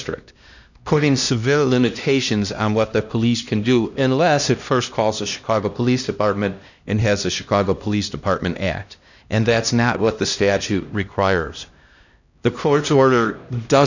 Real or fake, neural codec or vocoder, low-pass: fake; codec, 16 kHz, 1 kbps, X-Codec, HuBERT features, trained on LibriSpeech; 7.2 kHz